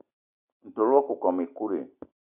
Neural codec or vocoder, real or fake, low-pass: none; real; 3.6 kHz